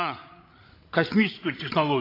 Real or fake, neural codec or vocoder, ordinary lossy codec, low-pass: fake; codec, 16 kHz, 16 kbps, FreqCodec, larger model; AAC, 24 kbps; 5.4 kHz